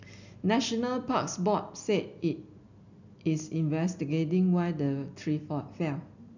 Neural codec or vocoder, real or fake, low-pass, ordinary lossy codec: none; real; 7.2 kHz; none